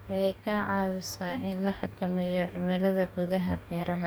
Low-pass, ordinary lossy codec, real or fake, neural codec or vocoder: none; none; fake; codec, 44.1 kHz, 2.6 kbps, DAC